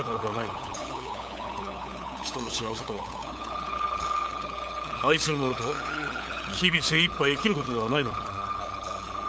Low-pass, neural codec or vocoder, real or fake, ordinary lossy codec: none; codec, 16 kHz, 8 kbps, FunCodec, trained on LibriTTS, 25 frames a second; fake; none